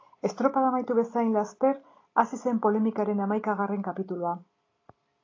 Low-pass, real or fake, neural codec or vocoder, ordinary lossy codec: 7.2 kHz; real; none; AAC, 32 kbps